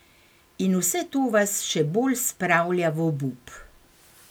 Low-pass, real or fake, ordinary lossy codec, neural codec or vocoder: none; real; none; none